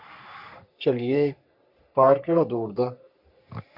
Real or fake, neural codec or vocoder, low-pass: fake; codec, 44.1 kHz, 3.4 kbps, Pupu-Codec; 5.4 kHz